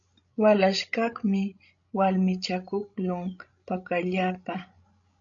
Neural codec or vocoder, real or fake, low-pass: codec, 16 kHz, 16 kbps, FreqCodec, larger model; fake; 7.2 kHz